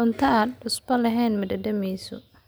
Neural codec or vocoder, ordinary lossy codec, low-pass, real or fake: none; none; none; real